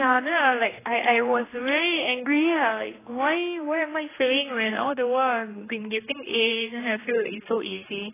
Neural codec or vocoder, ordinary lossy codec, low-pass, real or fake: codec, 16 kHz, 2 kbps, X-Codec, HuBERT features, trained on general audio; AAC, 16 kbps; 3.6 kHz; fake